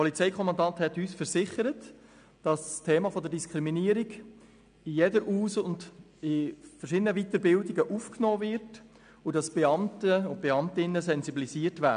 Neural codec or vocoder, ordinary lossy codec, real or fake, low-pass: none; none; real; 9.9 kHz